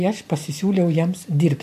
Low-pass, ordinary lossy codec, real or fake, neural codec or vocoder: 14.4 kHz; MP3, 64 kbps; real; none